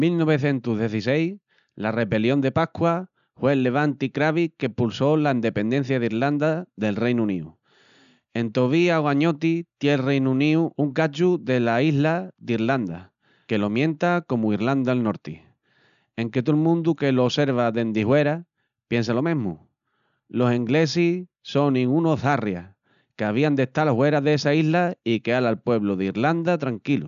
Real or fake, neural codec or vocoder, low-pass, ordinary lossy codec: real; none; 7.2 kHz; none